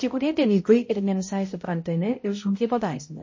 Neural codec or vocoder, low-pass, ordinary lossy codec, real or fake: codec, 16 kHz, 0.5 kbps, X-Codec, HuBERT features, trained on balanced general audio; 7.2 kHz; MP3, 32 kbps; fake